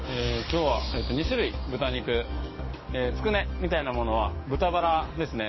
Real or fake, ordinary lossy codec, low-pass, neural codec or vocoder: fake; MP3, 24 kbps; 7.2 kHz; codec, 44.1 kHz, 7.8 kbps, DAC